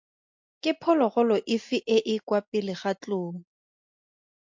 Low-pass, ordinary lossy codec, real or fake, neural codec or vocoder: 7.2 kHz; MP3, 48 kbps; real; none